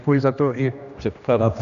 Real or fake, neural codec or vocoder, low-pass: fake; codec, 16 kHz, 1 kbps, X-Codec, HuBERT features, trained on general audio; 7.2 kHz